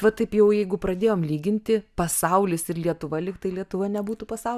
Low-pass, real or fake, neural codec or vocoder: 14.4 kHz; real; none